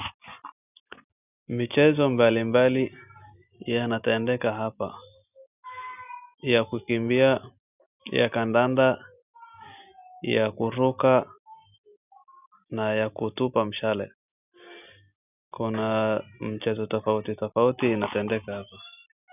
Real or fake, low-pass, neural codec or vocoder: real; 3.6 kHz; none